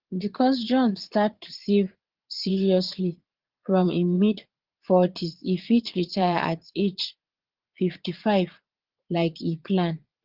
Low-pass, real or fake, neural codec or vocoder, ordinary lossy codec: 5.4 kHz; fake; vocoder, 22.05 kHz, 80 mel bands, Vocos; Opus, 16 kbps